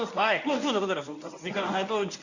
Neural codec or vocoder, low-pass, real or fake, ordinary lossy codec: codec, 24 kHz, 0.9 kbps, WavTokenizer, medium speech release version 1; 7.2 kHz; fake; none